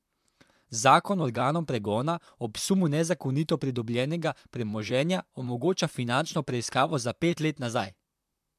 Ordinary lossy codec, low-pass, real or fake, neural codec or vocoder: MP3, 96 kbps; 14.4 kHz; fake; vocoder, 44.1 kHz, 128 mel bands, Pupu-Vocoder